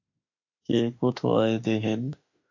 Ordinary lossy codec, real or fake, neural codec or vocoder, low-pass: AAC, 48 kbps; fake; autoencoder, 48 kHz, 32 numbers a frame, DAC-VAE, trained on Japanese speech; 7.2 kHz